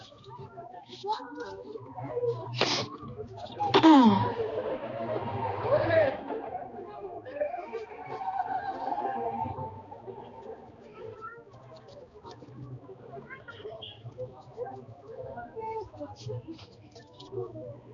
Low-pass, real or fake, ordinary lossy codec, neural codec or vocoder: 7.2 kHz; fake; MP3, 96 kbps; codec, 16 kHz, 2 kbps, X-Codec, HuBERT features, trained on balanced general audio